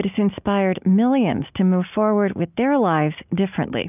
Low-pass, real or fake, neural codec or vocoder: 3.6 kHz; real; none